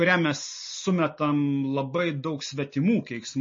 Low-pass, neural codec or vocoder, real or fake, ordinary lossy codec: 7.2 kHz; none; real; MP3, 32 kbps